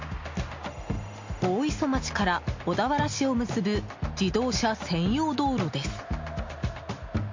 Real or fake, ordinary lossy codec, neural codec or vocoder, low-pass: real; MP3, 48 kbps; none; 7.2 kHz